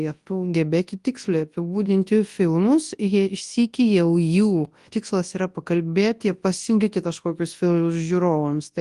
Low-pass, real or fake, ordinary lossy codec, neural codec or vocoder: 10.8 kHz; fake; Opus, 24 kbps; codec, 24 kHz, 0.9 kbps, WavTokenizer, large speech release